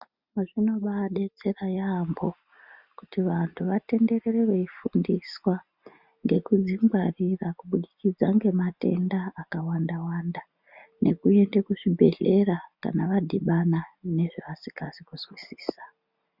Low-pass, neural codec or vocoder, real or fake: 5.4 kHz; none; real